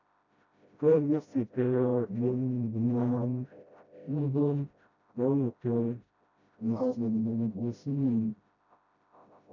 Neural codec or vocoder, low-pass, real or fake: codec, 16 kHz, 0.5 kbps, FreqCodec, smaller model; 7.2 kHz; fake